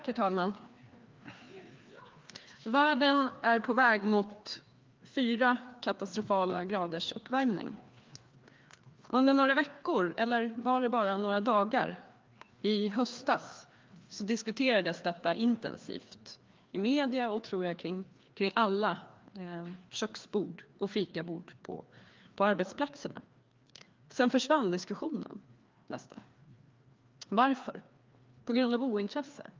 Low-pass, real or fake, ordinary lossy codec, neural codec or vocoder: 7.2 kHz; fake; Opus, 32 kbps; codec, 16 kHz, 2 kbps, FreqCodec, larger model